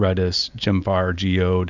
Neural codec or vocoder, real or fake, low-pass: none; real; 7.2 kHz